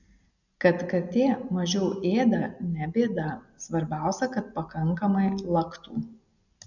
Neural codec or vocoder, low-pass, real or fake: none; 7.2 kHz; real